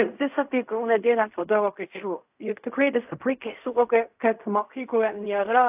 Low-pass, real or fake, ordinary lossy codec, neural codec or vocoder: 3.6 kHz; fake; AAC, 32 kbps; codec, 16 kHz in and 24 kHz out, 0.4 kbps, LongCat-Audio-Codec, fine tuned four codebook decoder